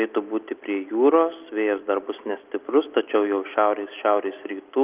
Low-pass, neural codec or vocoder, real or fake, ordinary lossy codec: 3.6 kHz; none; real; Opus, 32 kbps